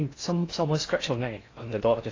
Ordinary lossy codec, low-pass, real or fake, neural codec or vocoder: AAC, 32 kbps; 7.2 kHz; fake; codec, 16 kHz in and 24 kHz out, 0.6 kbps, FocalCodec, streaming, 2048 codes